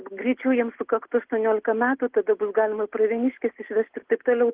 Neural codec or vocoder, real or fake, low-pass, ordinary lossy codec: none; real; 3.6 kHz; Opus, 16 kbps